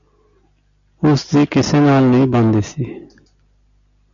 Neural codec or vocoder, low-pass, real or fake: none; 7.2 kHz; real